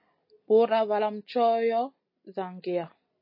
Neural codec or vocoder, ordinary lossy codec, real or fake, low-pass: none; MP3, 24 kbps; real; 5.4 kHz